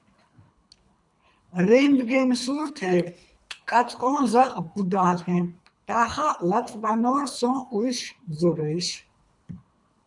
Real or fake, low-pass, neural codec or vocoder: fake; 10.8 kHz; codec, 24 kHz, 3 kbps, HILCodec